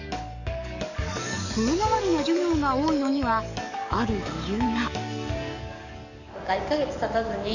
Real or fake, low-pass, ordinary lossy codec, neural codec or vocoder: fake; 7.2 kHz; none; codec, 44.1 kHz, 7.8 kbps, DAC